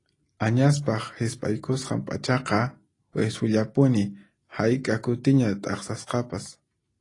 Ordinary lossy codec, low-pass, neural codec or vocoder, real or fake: AAC, 32 kbps; 10.8 kHz; none; real